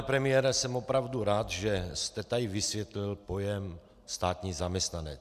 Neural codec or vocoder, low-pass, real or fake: none; 14.4 kHz; real